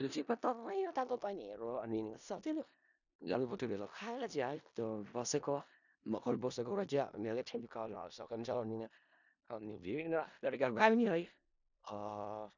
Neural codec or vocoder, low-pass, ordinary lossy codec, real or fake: codec, 16 kHz in and 24 kHz out, 0.4 kbps, LongCat-Audio-Codec, four codebook decoder; 7.2 kHz; none; fake